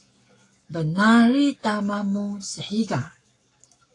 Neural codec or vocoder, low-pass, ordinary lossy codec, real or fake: codec, 44.1 kHz, 7.8 kbps, Pupu-Codec; 10.8 kHz; AAC, 48 kbps; fake